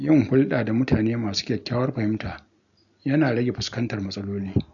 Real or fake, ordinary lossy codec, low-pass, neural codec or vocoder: real; none; 7.2 kHz; none